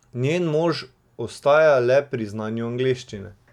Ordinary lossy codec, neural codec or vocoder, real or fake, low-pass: none; none; real; 19.8 kHz